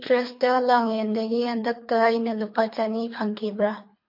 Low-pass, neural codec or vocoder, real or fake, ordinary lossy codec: 5.4 kHz; codec, 24 kHz, 3 kbps, HILCodec; fake; MP3, 32 kbps